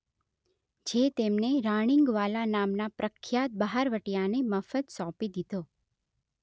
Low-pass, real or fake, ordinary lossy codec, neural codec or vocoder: none; real; none; none